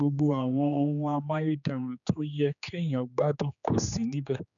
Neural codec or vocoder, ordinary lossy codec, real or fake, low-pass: codec, 16 kHz, 2 kbps, X-Codec, HuBERT features, trained on general audio; none; fake; 7.2 kHz